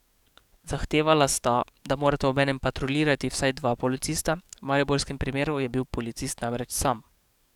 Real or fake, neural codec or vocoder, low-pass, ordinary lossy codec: fake; codec, 44.1 kHz, 7.8 kbps, DAC; 19.8 kHz; none